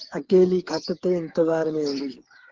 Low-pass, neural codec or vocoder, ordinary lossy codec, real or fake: 7.2 kHz; codec, 16 kHz, 8 kbps, FreqCodec, smaller model; Opus, 16 kbps; fake